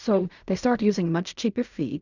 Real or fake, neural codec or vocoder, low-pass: fake; codec, 16 kHz in and 24 kHz out, 0.4 kbps, LongCat-Audio-Codec, fine tuned four codebook decoder; 7.2 kHz